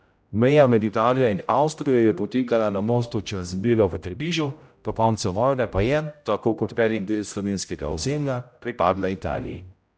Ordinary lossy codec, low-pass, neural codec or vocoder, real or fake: none; none; codec, 16 kHz, 0.5 kbps, X-Codec, HuBERT features, trained on general audio; fake